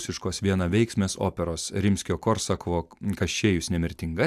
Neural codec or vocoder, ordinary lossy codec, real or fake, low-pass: none; AAC, 96 kbps; real; 14.4 kHz